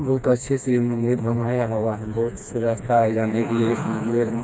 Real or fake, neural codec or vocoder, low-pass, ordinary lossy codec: fake; codec, 16 kHz, 2 kbps, FreqCodec, smaller model; none; none